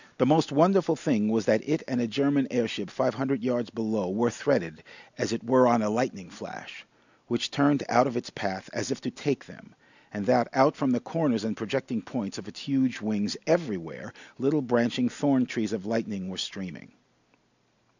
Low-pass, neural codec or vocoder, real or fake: 7.2 kHz; none; real